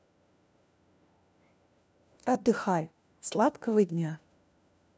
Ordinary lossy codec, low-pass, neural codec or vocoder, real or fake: none; none; codec, 16 kHz, 1 kbps, FunCodec, trained on LibriTTS, 50 frames a second; fake